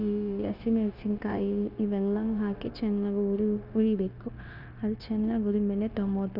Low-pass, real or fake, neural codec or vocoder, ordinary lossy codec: 5.4 kHz; fake; codec, 16 kHz, 0.9 kbps, LongCat-Audio-Codec; none